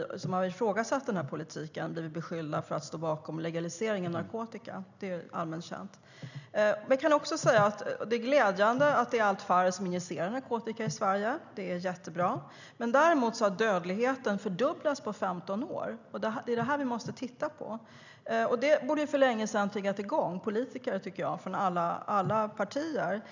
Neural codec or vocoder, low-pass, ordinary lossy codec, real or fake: none; 7.2 kHz; none; real